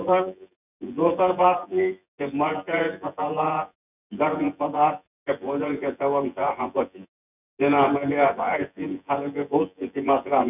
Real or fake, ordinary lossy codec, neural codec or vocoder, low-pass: fake; none; vocoder, 24 kHz, 100 mel bands, Vocos; 3.6 kHz